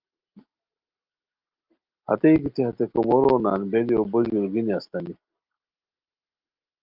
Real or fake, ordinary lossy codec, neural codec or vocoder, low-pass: real; Opus, 32 kbps; none; 5.4 kHz